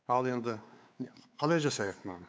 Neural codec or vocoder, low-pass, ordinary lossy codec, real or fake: codec, 16 kHz, 4 kbps, X-Codec, WavLM features, trained on Multilingual LibriSpeech; none; none; fake